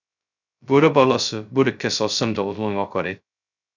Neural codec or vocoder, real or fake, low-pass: codec, 16 kHz, 0.2 kbps, FocalCodec; fake; 7.2 kHz